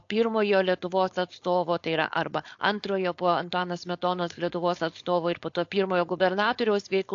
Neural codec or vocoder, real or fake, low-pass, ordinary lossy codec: codec, 16 kHz, 4.8 kbps, FACodec; fake; 7.2 kHz; AAC, 48 kbps